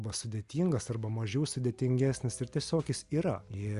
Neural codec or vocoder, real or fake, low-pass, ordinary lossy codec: none; real; 10.8 kHz; Opus, 64 kbps